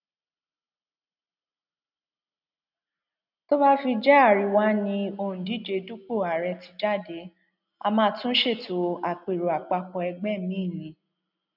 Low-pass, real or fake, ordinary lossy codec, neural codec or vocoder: 5.4 kHz; real; none; none